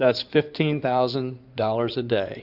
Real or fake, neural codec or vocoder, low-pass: fake; codec, 16 kHz in and 24 kHz out, 2.2 kbps, FireRedTTS-2 codec; 5.4 kHz